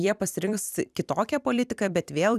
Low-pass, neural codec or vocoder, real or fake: 14.4 kHz; vocoder, 44.1 kHz, 128 mel bands every 256 samples, BigVGAN v2; fake